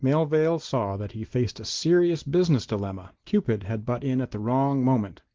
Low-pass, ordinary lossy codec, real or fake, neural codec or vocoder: 7.2 kHz; Opus, 16 kbps; real; none